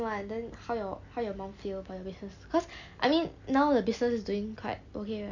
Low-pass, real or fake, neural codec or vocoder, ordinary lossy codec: 7.2 kHz; real; none; none